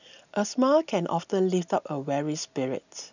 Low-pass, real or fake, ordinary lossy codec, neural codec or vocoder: 7.2 kHz; real; none; none